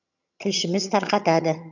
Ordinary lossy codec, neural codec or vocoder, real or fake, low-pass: none; vocoder, 22.05 kHz, 80 mel bands, HiFi-GAN; fake; 7.2 kHz